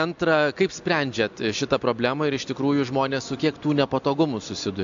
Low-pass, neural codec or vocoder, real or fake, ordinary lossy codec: 7.2 kHz; none; real; AAC, 64 kbps